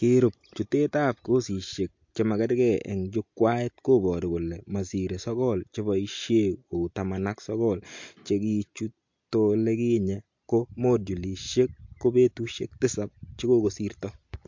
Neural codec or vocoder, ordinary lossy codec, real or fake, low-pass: none; MP3, 48 kbps; real; 7.2 kHz